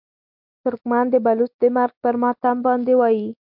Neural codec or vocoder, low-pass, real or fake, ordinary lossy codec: none; 5.4 kHz; real; MP3, 48 kbps